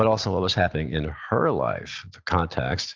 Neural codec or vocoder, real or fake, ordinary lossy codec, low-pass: none; real; Opus, 24 kbps; 7.2 kHz